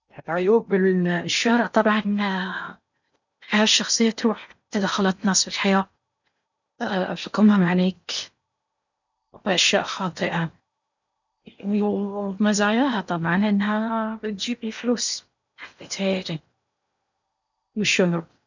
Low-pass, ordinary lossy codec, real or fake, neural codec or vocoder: 7.2 kHz; none; fake; codec, 16 kHz in and 24 kHz out, 0.8 kbps, FocalCodec, streaming, 65536 codes